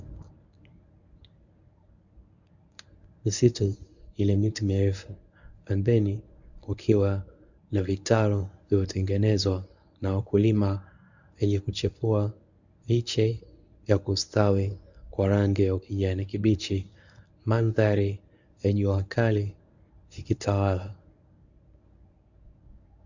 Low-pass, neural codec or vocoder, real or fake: 7.2 kHz; codec, 24 kHz, 0.9 kbps, WavTokenizer, medium speech release version 1; fake